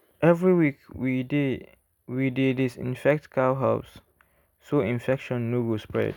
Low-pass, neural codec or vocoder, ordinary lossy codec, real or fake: 19.8 kHz; none; none; real